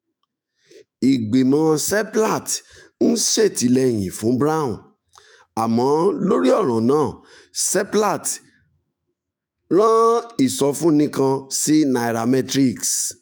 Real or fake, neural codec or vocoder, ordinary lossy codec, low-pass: fake; autoencoder, 48 kHz, 128 numbers a frame, DAC-VAE, trained on Japanese speech; none; none